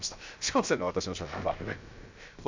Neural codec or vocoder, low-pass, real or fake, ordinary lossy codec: codec, 16 kHz, about 1 kbps, DyCAST, with the encoder's durations; 7.2 kHz; fake; MP3, 64 kbps